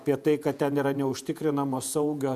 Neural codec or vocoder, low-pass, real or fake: vocoder, 48 kHz, 128 mel bands, Vocos; 14.4 kHz; fake